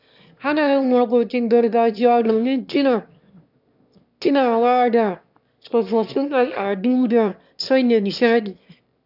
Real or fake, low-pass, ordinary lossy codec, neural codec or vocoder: fake; 5.4 kHz; none; autoencoder, 22.05 kHz, a latent of 192 numbers a frame, VITS, trained on one speaker